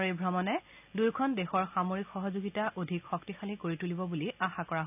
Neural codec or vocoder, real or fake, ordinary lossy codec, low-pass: none; real; none; 3.6 kHz